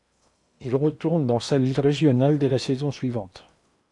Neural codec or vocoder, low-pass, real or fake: codec, 16 kHz in and 24 kHz out, 0.8 kbps, FocalCodec, streaming, 65536 codes; 10.8 kHz; fake